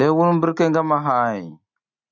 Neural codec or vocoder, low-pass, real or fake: none; 7.2 kHz; real